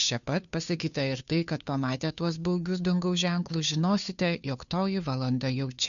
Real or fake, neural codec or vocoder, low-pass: fake; codec, 16 kHz, 2 kbps, FunCodec, trained on Chinese and English, 25 frames a second; 7.2 kHz